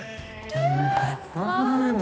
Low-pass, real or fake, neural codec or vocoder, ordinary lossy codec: none; fake; codec, 16 kHz, 1 kbps, X-Codec, HuBERT features, trained on general audio; none